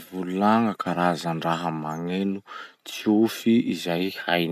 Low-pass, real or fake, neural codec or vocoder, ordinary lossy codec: 14.4 kHz; real; none; none